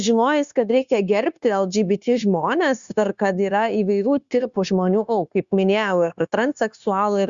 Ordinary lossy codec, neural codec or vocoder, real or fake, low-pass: Opus, 64 kbps; codec, 16 kHz, 0.9 kbps, LongCat-Audio-Codec; fake; 7.2 kHz